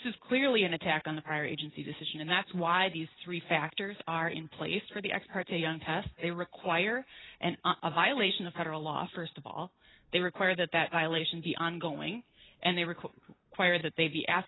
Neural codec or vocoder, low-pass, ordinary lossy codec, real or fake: none; 7.2 kHz; AAC, 16 kbps; real